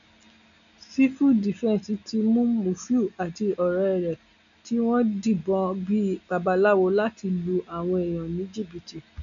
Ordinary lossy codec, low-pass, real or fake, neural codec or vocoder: none; 7.2 kHz; real; none